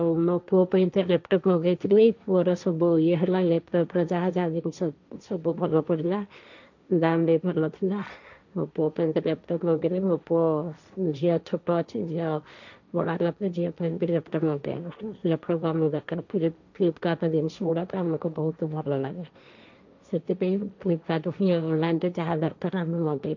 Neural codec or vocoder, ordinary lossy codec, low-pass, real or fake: codec, 16 kHz, 1.1 kbps, Voila-Tokenizer; none; 7.2 kHz; fake